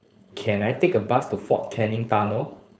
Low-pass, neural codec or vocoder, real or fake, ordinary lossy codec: none; codec, 16 kHz, 4.8 kbps, FACodec; fake; none